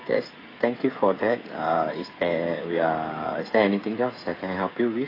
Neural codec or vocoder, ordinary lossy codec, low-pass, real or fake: codec, 16 kHz, 8 kbps, FreqCodec, smaller model; AAC, 24 kbps; 5.4 kHz; fake